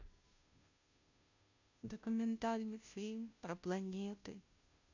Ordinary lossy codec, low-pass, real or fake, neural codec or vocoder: none; 7.2 kHz; fake; codec, 16 kHz, 0.5 kbps, FunCodec, trained on Chinese and English, 25 frames a second